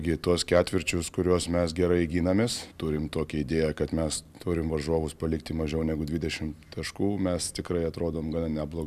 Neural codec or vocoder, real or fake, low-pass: none; real; 14.4 kHz